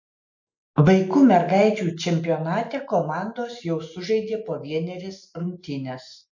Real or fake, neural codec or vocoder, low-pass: real; none; 7.2 kHz